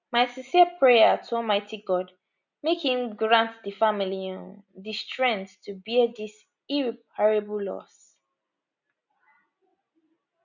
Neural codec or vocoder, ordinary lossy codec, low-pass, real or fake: none; none; 7.2 kHz; real